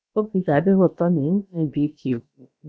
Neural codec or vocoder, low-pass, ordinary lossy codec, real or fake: codec, 16 kHz, about 1 kbps, DyCAST, with the encoder's durations; none; none; fake